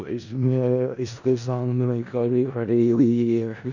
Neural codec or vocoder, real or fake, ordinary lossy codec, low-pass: codec, 16 kHz in and 24 kHz out, 0.4 kbps, LongCat-Audio-Codec, four codebook decoder; fake; none; 7.2 kHz